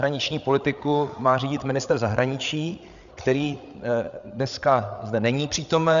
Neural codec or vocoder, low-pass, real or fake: codec, 16 kHz, 8 kbps, FreqCodec, larger model; 7.2 kHz; fake